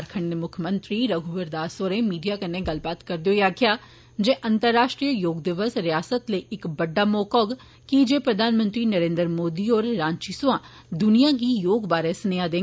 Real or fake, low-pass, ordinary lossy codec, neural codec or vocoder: real; none; none; none